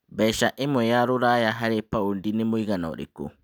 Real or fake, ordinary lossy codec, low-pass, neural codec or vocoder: real; none; none; none